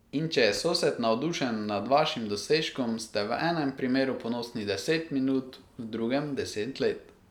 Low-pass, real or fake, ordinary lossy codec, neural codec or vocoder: 19.8 kHz; real; none; none